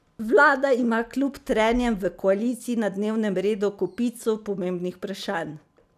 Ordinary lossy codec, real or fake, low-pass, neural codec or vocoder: none; real; 14.4 kHz; none